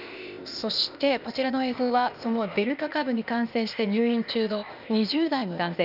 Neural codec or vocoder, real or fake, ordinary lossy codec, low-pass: codec, 16 kHz, 0.8 kbps, ZipCodec; fake; none; 5.4 kHz